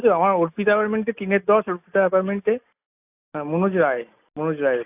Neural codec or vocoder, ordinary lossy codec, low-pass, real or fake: none; AAC, 32 kbps; 3.6 kHz; real